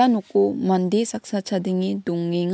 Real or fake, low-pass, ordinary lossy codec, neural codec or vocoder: real; none; none; none